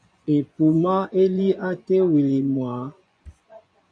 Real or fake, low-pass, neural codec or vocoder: fake; 9.9 kHz; vocoder, 24 kHz, 100 mel bands, Vocos